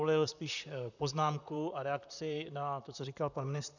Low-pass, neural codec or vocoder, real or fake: 7.2 kHz; vocoder, 44.1 kHz, 128 mel bands, Pupu-Vocoder; fake